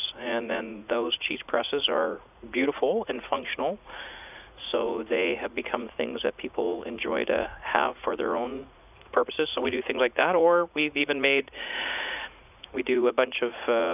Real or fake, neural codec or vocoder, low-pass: fake; vocoder, 44.1 kHz, 80 mel bands, Vocos; 3.6 kHz